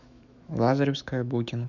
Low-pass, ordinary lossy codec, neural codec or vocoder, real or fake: 7.2 kHz; none; autoencoder, 48 kHz, 128 numbers a frame, DAC-VAE, trained on Japanese speech; fake